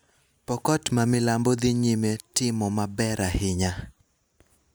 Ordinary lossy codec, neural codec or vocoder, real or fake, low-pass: none; none; real; none